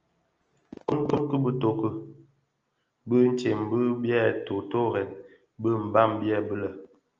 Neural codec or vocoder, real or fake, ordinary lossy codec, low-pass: none; real; Opus, 24 kbps; 7.2 kHz